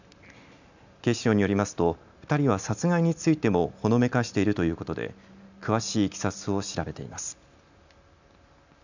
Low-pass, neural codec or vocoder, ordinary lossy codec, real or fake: 7.2 kHz; none; none; real